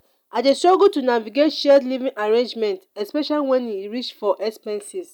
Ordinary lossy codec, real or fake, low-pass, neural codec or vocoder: none; real; 19.8 kHz; none